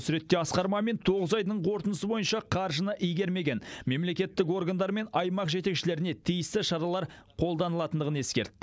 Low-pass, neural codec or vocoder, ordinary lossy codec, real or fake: none; none; none; real